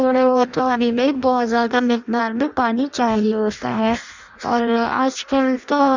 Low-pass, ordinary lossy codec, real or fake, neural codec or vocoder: 7.2 kHz; Opus, 64 kbps; fake; codec, 16 kHz in and 24 kHz out, 0.6 kbps, FireRedTTS-2 codec